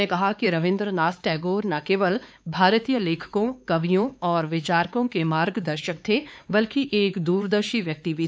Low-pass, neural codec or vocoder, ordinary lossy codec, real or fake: none; codec, 16 kHz, 4 kbps, X-Codec, HuBERT features, trained on LibriSpeech; none; fake